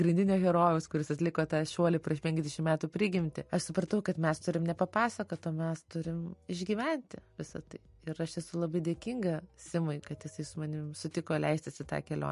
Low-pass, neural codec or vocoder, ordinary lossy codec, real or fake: 14.4 kHz; none; MP3, 48 kbps; real